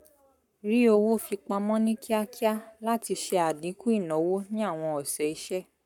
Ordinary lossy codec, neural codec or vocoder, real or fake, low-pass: none; codec, 44.1 kHz, 7.8 kbps, Pupu-Codec; fake; 19.8 kHz